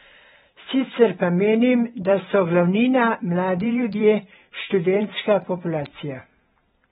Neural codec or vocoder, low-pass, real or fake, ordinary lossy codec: vocoder, 44.1 kHz, 128 mel bands every 512 samples, BigVGAN v2; 19.8 kHz; fake; AAC, 16 kbps